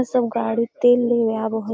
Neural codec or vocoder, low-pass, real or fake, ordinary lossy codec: none; none; real; none